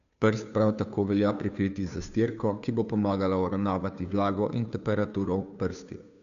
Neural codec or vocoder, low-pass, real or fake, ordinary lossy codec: codec, 16 kHz, 2 kbps, FunCodec, trained on Chinese and English, 25 frames a second; 7.2 kHz; fake; none